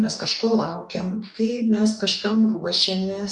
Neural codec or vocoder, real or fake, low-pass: codec, 44.1 kHz, 2.6 kbps, DAC; fake; 10.8 kHz